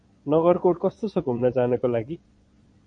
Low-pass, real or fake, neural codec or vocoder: 9.9 kHz; fake; vocoder, 22.05 kHz, 80 mel bands, Vocos